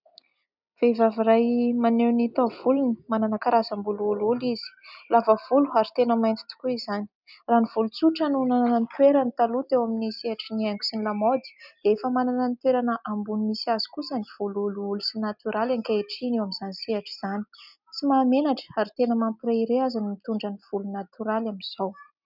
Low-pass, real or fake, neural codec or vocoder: 5.4 kHz; real; none